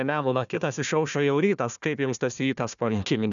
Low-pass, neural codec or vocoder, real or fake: 7.2 kHz; codec, 16 kHz, 1 kbps, FunCodec, trained on Chinese and English, 50 frames a second; fake